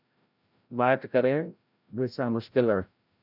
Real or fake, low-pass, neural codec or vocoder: fake; 5.4 kHz; codec, 16 kHz, 0.5 kbps, FreqCodec, larger model